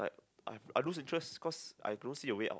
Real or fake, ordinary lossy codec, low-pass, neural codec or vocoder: real; none; none; none